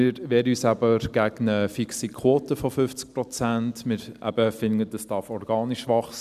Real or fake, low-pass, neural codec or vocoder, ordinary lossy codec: real; 14.4 kHz; none; none